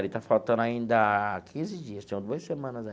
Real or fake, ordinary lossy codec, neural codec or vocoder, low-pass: real; none; none; none